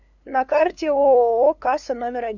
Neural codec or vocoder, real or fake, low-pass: codec, 16 kHz, 2 kbps, FunCodec, trained on LibriTTS, 25 frames a second; fake; 7.2 kHz